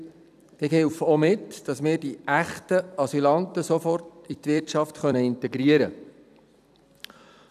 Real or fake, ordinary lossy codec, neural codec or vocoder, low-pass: fake; none; vocoder, 44.1 kHz, 128 mel bands every 256 samples, BigVGAN v2; 14.4 kHz